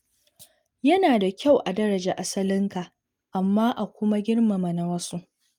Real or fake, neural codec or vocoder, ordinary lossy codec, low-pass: real; none; Opus, 32 kbps; 19.8 kHz